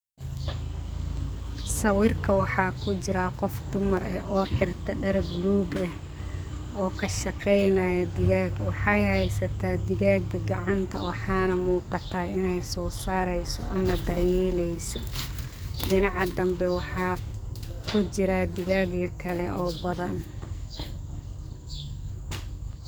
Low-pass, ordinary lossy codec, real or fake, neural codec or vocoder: none; none; fake; codec, 44.1 kHz, 2.6 kbps, SNAC